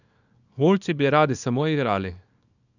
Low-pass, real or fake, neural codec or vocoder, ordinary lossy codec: 7.2 kHz; fake; codec, 24 kHz, 0.9 kbps, WavTokenizer, small release; none